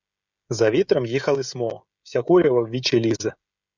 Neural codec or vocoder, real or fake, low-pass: codec, 16 kHz, 16 kbps, FreqCodec, smaller model; fake; 7.2 kHz